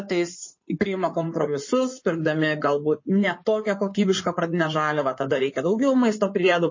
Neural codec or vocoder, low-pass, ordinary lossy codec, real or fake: codec, 16 kHz in and 24 kHz out, 2.2 kbps, FireRedTTS-2 codec; 7.2 kHz; MP3, 32 kbps; fake